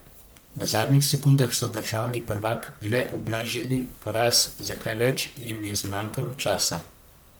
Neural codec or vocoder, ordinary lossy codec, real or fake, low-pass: codec, 44.1 kHz, 1.7 kbps, Pupu-Codec; none; fake; none